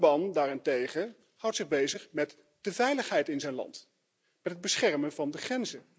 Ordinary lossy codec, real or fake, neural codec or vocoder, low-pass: none; real; none; none